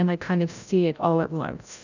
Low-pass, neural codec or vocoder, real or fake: 7.2 kHz; codec, 16 kHz, 0.5 kbps, FreqCodec, larger model; fake